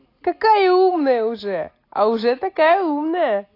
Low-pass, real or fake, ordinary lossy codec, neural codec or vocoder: 5.4 kHz; real; AAC, 32 kbps; none